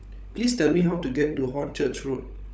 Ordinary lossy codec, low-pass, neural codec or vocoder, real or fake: none; none; codec, 16 kHz, 16 kbps, FunCodec, trained on LibriTTS, 50 frames a second; fake